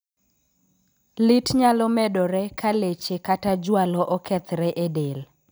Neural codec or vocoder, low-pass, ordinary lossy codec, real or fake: none; none; none; real